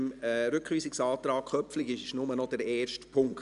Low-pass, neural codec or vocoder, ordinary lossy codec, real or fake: 10.8 kHz; none; none; real